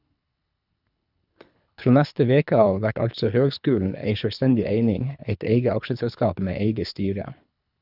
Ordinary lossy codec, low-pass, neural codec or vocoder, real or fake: none; 5.4 kHz; codec, 24 kHz, 3 kbps, HILCodec; fake